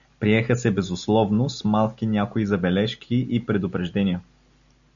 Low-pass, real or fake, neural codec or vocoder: 7.2 kHz; real; none